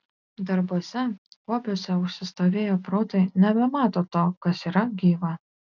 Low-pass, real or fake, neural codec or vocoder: 7.2 kHz; real; none